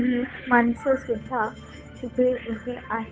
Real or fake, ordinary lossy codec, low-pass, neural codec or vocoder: fake; none; none; codec, 16 kHz, 8 kbps, FunCodec, trained on Chinese and English, 25 frames a second